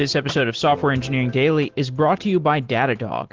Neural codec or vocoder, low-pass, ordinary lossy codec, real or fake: none; 7.2 kHz; Opus, 16 kbps; real